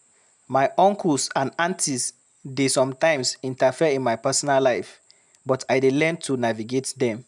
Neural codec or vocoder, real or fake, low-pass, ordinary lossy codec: none; real; 10.8 kHz; none